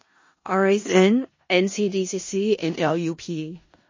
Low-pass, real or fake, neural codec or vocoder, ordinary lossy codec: 7.2 kHz; fake; codec, 16 kHz in and 24 kHz out, 0.4 kbps, LongCat-Audio-Codec, four codebook decoder; MP3, 32 kbps